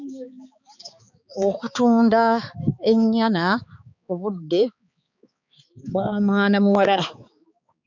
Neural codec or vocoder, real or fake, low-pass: codec, 16 kHz, 4 kbps, X-Codec, HuBERT features, trained on balanced general audio; fake; 7.2 kHz